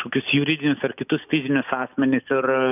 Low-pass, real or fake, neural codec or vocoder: 3.6 kHz; real; none